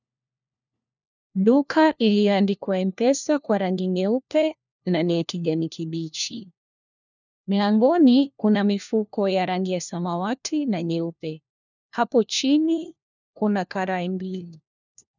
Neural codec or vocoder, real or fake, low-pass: codec, 16 kHz, 1 kbps, FunCodec, trained on LibriTTS, 50 frames a second; fake; 7.2 kHz